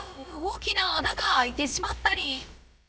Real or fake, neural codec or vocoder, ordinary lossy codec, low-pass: fake; codec, 16 kHz, about 1 kbps, DyCAST, with the encoder's durations; none; none